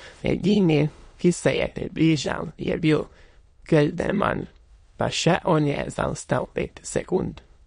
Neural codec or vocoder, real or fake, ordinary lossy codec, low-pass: autoencoder, 22.05 kHz, a latent of 192 numbers a frame, VITS, trained on many speakers; fake; MP3, 48 kbps; 9.9 kHz